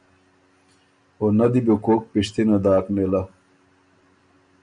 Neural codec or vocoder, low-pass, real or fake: none; 9.9 kHz; real